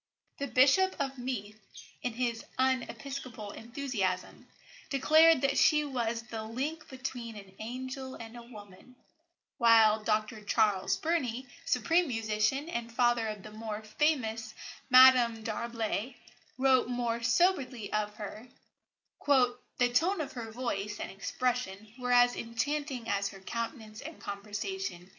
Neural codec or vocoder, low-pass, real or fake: none; 7.2 kHz; real